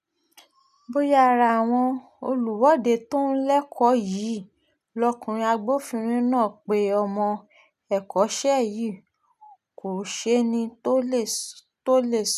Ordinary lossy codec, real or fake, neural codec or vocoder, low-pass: none; real; none; 14.4 kHz